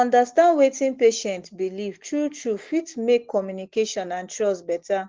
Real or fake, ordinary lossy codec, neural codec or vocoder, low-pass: real; Opus, 16 kbps; none; 7.2 kHz